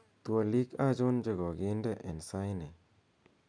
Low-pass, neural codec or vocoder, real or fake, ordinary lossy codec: 9.9 kHz; none; real; none